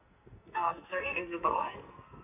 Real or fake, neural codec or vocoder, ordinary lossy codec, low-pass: fake; codec, 44.1 kHz, 2.6 kbps, SNAC; none; 3.6 kHz